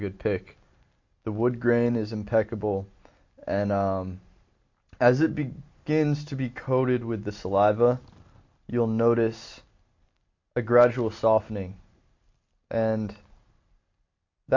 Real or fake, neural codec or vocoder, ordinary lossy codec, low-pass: real; none; MP3, 48 kbps; 7.2 kHz